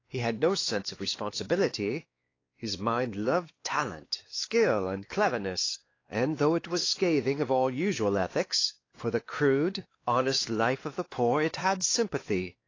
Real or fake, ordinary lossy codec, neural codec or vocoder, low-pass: fake; AAC, 32 kbps; codec, 16 kHz, 2 kbps, X-Codec, WavLM features, trained on Multilingual LibriSpeech; 7.2 kHz